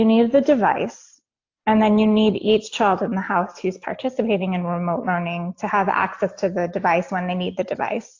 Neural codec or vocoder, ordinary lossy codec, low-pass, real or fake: none; AAC, 48 kbps; 7.2 kHz; real